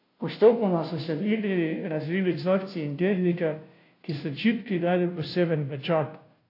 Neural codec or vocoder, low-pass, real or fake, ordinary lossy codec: codec, 16 kHz, 0.5 kbps, FunCodec, trained on Chinese and English, 25 frames a second; 5.4 kHz; fake; AAC, 32 kbps